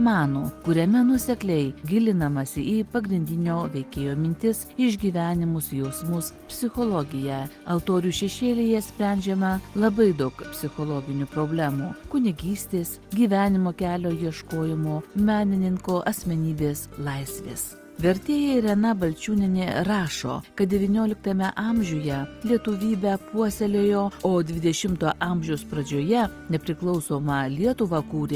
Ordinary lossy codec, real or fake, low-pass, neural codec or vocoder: Opus, 24 kbps; real; 14.4 kHz; none